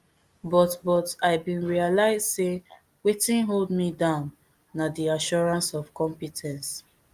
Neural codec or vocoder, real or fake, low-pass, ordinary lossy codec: none; real; 14.4 kHz; Opus, 32 kbps